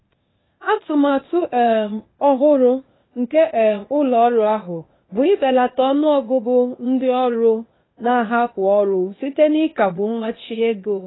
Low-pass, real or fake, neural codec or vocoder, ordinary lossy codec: 7.2 kHz; fake; codec, 16 kHz, 0.8 kbps, ZipCodec; AAC, 16 kbps